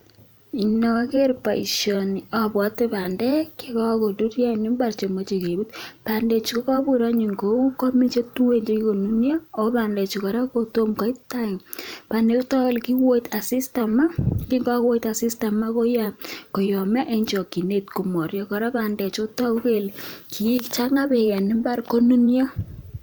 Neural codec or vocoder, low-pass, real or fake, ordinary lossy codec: vocoder, 44.1 kHz, 128 mel bands every 512 samples, BigVGAN v2; none; fake; none